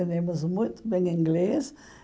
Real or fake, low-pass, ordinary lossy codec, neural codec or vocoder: real; none; none; none